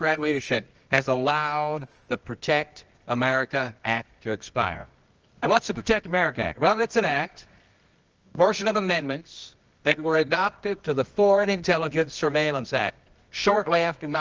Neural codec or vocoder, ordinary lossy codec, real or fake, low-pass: codec, 24 kHz, 0.9 kbps, WavTokenizer, medium music audio release; Opus, 24 kbps; fake; 7.2 kHz